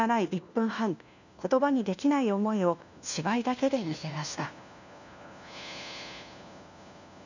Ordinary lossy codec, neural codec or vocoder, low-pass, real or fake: none; codec, 16 kHz, 1 kbps, FunCodec, trained on LibriTTS, 50 frames a second; 7.2 kHz; fake